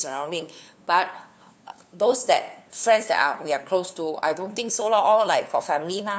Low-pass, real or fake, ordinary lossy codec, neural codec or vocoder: none; fake; none; codec, 16 kHz, 2 kbps, FunCodec, trained on LibriTTS, 25 frames a second